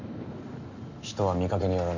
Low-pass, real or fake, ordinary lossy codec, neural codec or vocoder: 7.2 kHz; real; none; none